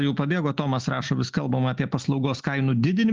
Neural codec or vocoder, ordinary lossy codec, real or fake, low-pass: none; Opus, 24 kbps; real; 7.2 kHz